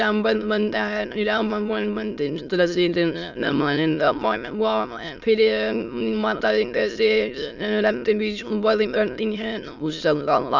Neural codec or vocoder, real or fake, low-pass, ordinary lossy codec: autoencoder, 22.05 kHz, a latent of 192 numbers a frame, VITS, trained on many speakers; fake; 7.2 kHz; none